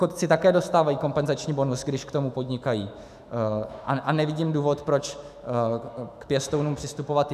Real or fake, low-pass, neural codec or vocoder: fake; 14.4 kHz; autoencoder, 48 kHz, 128 numbers a frame, DAC-VAE, trained on Japanese speech